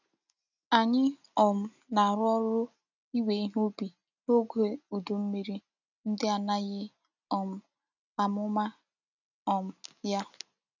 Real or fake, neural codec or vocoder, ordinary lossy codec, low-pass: real; none; none; 7.2 kHz